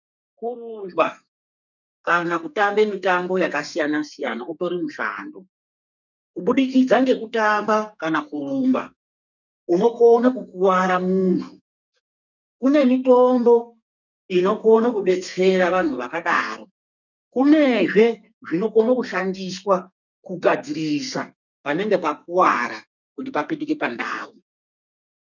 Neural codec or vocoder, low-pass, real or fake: codec, 32 kHz, 1.9 kbps, SNAC; 7.2 kHz; fake